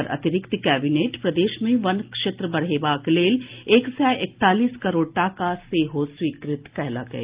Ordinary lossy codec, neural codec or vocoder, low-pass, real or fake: Opus, 32 kbps; none; 3.6 kHz; real